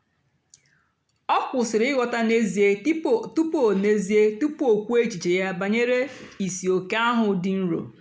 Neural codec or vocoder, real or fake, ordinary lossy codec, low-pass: none; real; none; none